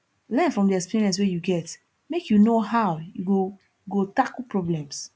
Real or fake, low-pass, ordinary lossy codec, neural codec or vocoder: real; none; none; none